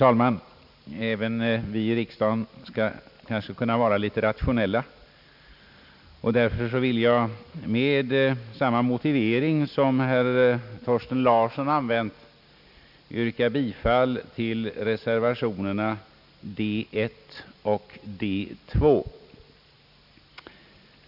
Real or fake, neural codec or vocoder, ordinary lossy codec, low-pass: real; none; none; 5.4 kHz